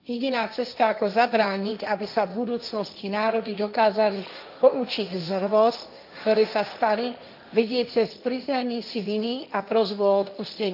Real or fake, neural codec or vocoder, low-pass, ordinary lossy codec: fake; codec, 16 kHz, 1.1 kbps, Voila-Tokenizer; 5.4 kHz; none